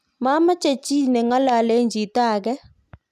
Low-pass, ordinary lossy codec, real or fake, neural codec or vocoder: 14.4 kHz; none; real; none